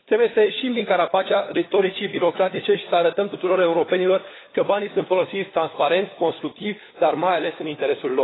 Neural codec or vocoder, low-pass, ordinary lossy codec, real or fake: codec, 16 kHz, 4 kbps, FunCodec, trained on LibriTTS, 50 frames a second; 7.2 kHz; AAC, 16 kbps; fake